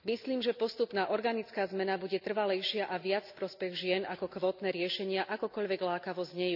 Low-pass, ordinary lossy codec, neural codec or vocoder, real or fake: 5.4 kHz; none; none; real